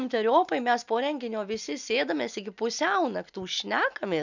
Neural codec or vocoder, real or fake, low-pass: none; real; 7.2 kHz